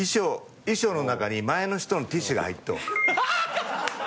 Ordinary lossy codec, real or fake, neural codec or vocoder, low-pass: none; real; none; none